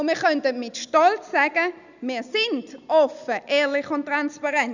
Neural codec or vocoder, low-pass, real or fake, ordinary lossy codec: none; 7.2 kHz; real; none